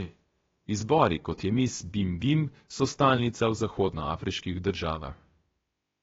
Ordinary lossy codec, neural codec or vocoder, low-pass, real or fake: AAC, 24 kbps; codec, 16 kHz, about 1 kbps, DyCAST, with the encoder's durations; 7.2 kHz; fake